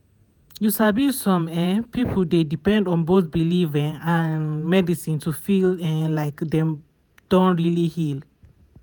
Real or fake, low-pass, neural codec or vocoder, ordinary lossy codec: fake; none; vocoder, 48 kHz, 128 mel bands, Vocos; none